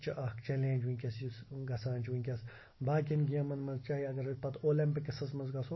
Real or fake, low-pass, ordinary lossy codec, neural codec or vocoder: real; 7.2 kHz; MP3, 24 kbps; none